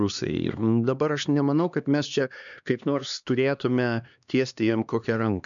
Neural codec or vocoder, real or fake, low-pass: codec, 16 kHz, 2 kbps, X-Codec, HuBERT features, trained on LibriSpeech; fake; 7.2 kHz